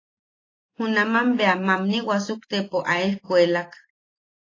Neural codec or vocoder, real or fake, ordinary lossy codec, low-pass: none; real; AAC, 32 kbps; 7.2 kHz